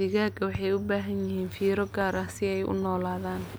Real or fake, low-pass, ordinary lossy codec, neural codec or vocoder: real; none; none; none